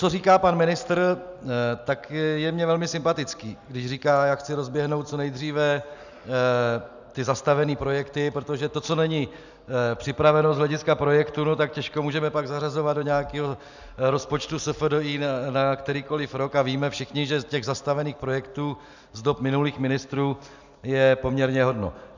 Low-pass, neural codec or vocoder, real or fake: 7.2 kHz; none; real